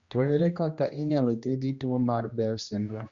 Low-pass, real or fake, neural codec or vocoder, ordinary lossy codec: 7.2 kHz; fake; codec, 16 kHz, 1 kbps, X-Codec, HuBERT features, trained on general audio; Opus, 64 kbps